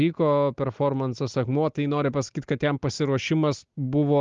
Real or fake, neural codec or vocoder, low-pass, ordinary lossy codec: real; none; 7.2 kHz; Opus, 32 kbps